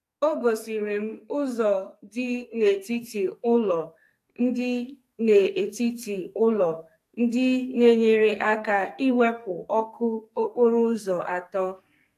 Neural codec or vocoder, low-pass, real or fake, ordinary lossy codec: codec, 44.1 kHz, 2.6 kbps, SNAC; 14.4 kHz; fake; AAC, 64 kbps